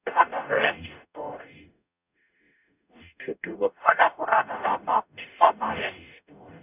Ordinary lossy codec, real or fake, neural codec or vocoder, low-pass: none; fake; codec, 44.1 kHz, 0.9 kbps, DAC; 3.6 kHz